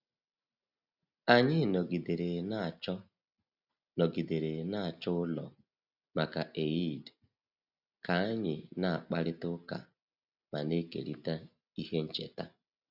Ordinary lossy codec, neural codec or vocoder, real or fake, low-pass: none; none; real; 5.4 kHz